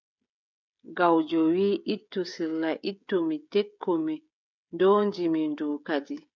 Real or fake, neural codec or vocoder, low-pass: fake; codec, 16 kHz, 16 kbps, FreqCodec, smaller model; 7.2 kHz